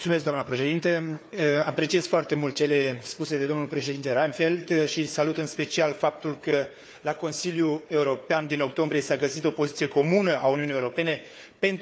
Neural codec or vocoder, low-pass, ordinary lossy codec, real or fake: codec, 16 kHz, 4 kbps, FunCodec, trained on Chinese and English, 50 frames a second; none; none; fake